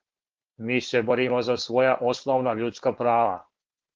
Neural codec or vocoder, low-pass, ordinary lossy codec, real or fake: codec, 16 kHz, 4.8 kbps, FACodec; 7.2 kHz; Opus, 16 kbps; fake